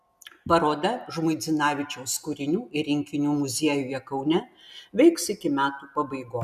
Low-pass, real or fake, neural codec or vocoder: 14.4 kHz; real; none